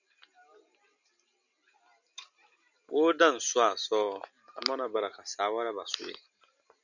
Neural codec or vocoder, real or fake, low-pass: none; real; 7.2 kHz